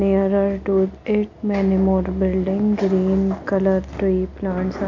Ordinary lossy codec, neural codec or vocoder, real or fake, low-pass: AAC, 48 kbps; none; real; 7.2 kHz